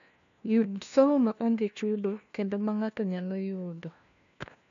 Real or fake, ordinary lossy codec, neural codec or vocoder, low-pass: fake; AAC, 96 kbps; codec, 16 kHz, 1 kbps, FunCodec, trained on LibriTTS, 50 frames a second; 7.2 kHz